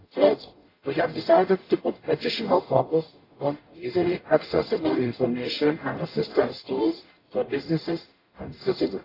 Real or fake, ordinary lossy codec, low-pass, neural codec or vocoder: fake; AAC, 24 kbps; 5.4 kHz; codec, 44.1 kHz, 0.9 kbps, DAC